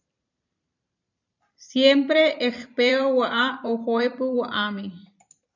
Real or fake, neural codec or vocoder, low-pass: fake; vocoder, 44.1 kHz, 128 mel bands every 512 samples, BigVGAN v2; 7.2 kHz